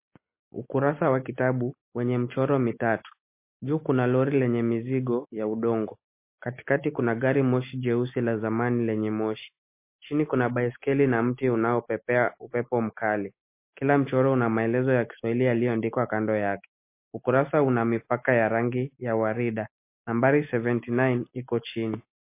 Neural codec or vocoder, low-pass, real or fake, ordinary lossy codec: none; 3.6 kHz; real; MP3, 24 kbps